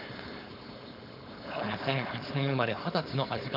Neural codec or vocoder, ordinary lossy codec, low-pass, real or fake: codec, 16 kHz, 4.8 kbps, FACodec; none; 5.4 kHz; fake